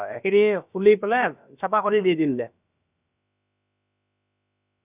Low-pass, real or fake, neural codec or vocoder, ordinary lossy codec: 3.6 kHz; fake; codec, 16 kHz, about 1 kbps, DyCAST, with the encoder's durations; none